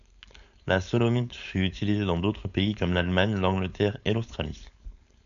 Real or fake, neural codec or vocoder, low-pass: fake; codec, 16 kHz, 4.8 kbps, FACodec; 7.2 kHz